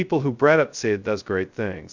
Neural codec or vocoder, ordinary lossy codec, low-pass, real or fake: codec, 16 kHz, 0.2 kbps, FocalCodec; Opus, 64 kbps; 7.2 kHz; fake